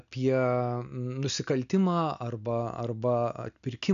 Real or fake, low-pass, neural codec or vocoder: real; 7.2 kHz; none